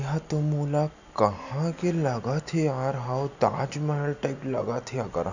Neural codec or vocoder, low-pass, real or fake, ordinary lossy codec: none; 7.2 kHz; real; none